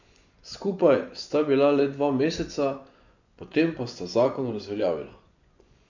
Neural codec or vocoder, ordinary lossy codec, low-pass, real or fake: vocoder, 24 kHz, 100 mel bands, Vocos; none; 7.2 kHz; fake